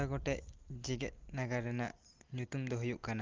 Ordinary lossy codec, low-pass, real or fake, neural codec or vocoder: Opus, 16 kbps; 7.2 kHz; real; none